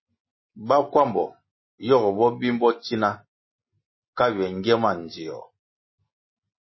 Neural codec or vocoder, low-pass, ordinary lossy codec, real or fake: none; 7.2 kHz; MP3, 24 kbps; real